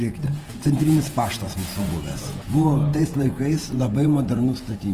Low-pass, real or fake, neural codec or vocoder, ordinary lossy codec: 14.4 kHz; fake; vocoder, 48 kHz, 128 mel bands, Vocos; Opus, 24 kbps